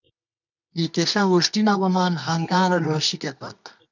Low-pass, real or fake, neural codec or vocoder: 7.2 kHz; fake; codec, 24 kHz, 0.9 kbps, WavTokenizer, medium music audio release